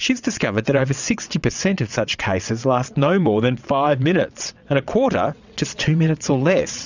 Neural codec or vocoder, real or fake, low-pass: vocoder, 22.05 kHz, 80 mel bands, WaveNeXt; fake; 7.2 kHz